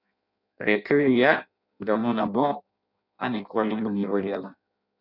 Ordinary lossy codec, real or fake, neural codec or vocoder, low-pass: none; fake; codec, 16 kHz in and 24 kHz out, 0.6 kbps, FireRedTTS-2 codec; 5.4 kHz